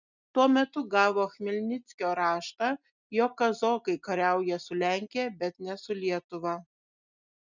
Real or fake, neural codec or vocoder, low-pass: real; none; 7.2 kHz